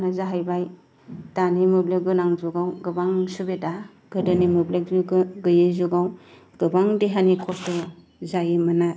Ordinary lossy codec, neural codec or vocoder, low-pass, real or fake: none; none; none; real